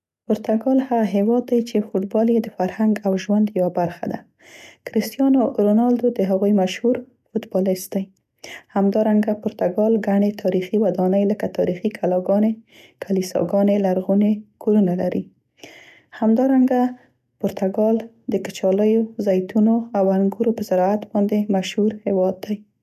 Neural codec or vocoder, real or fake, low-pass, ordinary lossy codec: none; real; 14.4 kHz; none